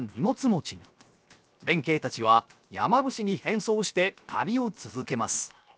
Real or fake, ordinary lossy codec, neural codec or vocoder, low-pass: fake; none; codec, 16 kHz, 0.7 kbps, FocalCodec; none